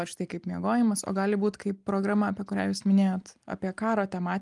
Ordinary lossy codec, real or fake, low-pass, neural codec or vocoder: Opus, 32 kbps; real; 10.8 kHz; none